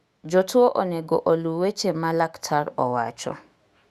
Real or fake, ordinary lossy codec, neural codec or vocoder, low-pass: fake; Opus, 64 kbps; autoencoder, 48 kHz, 128 numbers a frame, DAC-VAE, trained on Japanese speech; 14.4 kHz